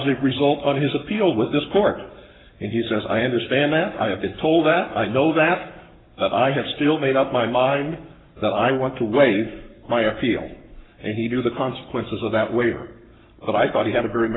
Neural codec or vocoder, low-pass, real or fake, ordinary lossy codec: codec, 44.1 kHz, 7.8 kbps, Pupu-Codec; 7.2 kHz; fake; AAC, 16 kbps